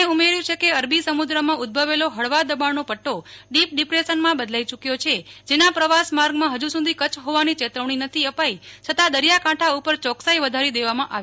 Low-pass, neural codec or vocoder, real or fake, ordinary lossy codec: none; none; real; none